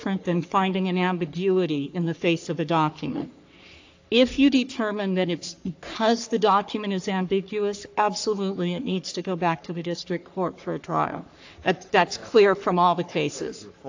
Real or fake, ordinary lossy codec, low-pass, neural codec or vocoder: fake; AAC, 48 kbps; 7.2 kHz; codec, 44.1 kHz, 3.4 kbps, Pupu-Codec